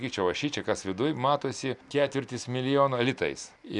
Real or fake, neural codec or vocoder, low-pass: real; none; 10.8 kHz